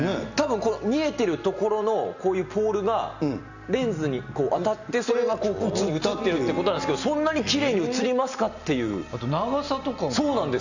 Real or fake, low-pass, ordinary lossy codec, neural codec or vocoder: real; 7.2 kHz; none; none